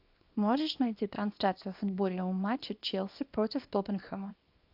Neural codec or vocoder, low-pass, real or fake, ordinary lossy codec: codec, 24 kHz, 0.9 kbps, WavTokenizer, small release; 5.4 kHz; fake; AAC, 48 kbps